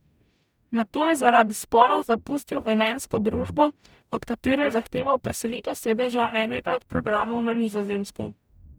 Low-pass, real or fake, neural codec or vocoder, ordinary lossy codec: none; fake; codec, 44.1 kHz, 0.9 kbps, DAC; none